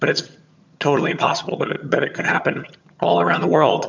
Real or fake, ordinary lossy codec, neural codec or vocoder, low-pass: fake; MP3, 64 kbps; vocoder, 22.05 kHz, 80 mel bands, HiFi-GAN; 7.2 kHz